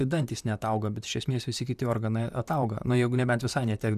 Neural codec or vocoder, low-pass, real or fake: vocoder, 44.1 kHz, 128 mel bands, Pupu-Vocoder; 14.4 kHz; fake